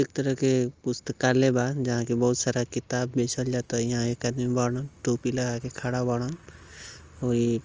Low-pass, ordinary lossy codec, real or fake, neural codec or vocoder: 7.2 kHz; Opus, 24 kbps; real; none